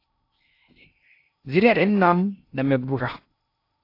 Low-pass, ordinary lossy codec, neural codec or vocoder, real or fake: 5.4 kHz; AAC, 32 kbps; codec, 16 kHz in and 24 kHz out, 0.6 kbps, FocalCodec, streaming, 2048 codes; fake